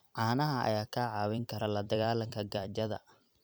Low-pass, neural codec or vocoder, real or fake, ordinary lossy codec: none; vocoder, 44.1 kHz, 128 mel bands every 256 samples, BigVGAN v2; fake; none